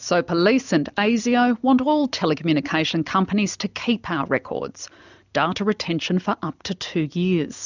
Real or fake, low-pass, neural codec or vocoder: real; 7.2 kHz; none